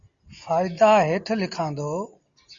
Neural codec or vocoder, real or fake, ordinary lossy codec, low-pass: none; real; Opus, 64 kbps; 7.2 kHz